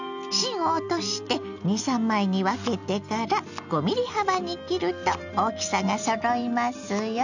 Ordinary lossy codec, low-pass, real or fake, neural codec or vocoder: none; 7.2 kHz; real; none